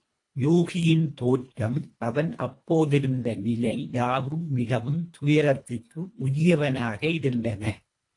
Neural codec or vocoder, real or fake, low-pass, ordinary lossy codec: codec, 24 kHz, 1.5 kbps, HILCodec; fake; 10.8 kHz; AAC, 48 kbps